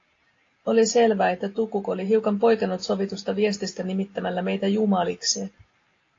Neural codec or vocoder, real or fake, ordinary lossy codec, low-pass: none; real; AAC, 32 kbps; 7.2 kHz